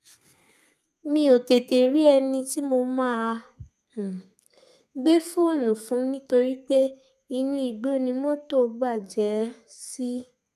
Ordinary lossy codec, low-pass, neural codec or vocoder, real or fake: none; 14.4 kHz; codec, 32 kHz, 1.9 kbps, SNAC; fake